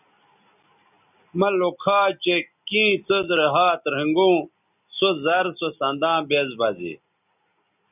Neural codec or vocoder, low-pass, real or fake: none; 3.6 kHz; real